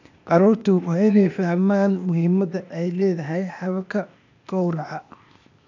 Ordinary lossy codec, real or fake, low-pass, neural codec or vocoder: none; fake; 7.2 kHz; codec, 16 kHz, 0.8 kbps, ZipCodec